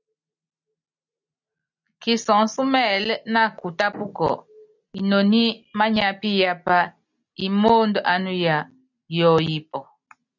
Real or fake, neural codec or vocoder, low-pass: real; none; 7.2 kHz